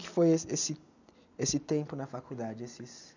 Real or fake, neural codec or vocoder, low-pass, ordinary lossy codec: real; none; 7.2 kHz; none